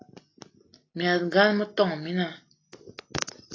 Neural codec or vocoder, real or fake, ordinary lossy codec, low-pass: none; real; AAC, 32 kbps; 7.2 kHz